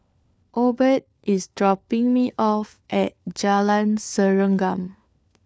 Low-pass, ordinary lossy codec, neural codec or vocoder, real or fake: none; none; codec, 16 kHz, 4 kbps, FunCodec, trained on LibriTTS, 50 frames a second; fake